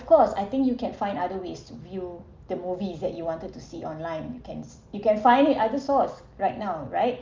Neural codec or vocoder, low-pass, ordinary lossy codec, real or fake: none; 7.2 kHz; Opus, 32 kbps; real